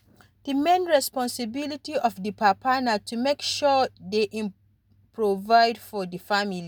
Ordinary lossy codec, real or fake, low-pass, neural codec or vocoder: none; real; none; none